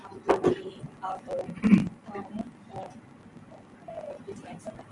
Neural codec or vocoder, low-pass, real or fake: none; 10.8 kHz; real